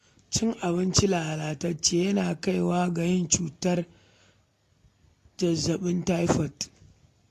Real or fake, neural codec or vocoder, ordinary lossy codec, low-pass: real; none; AAC, 48 kbps; 14.4 kHz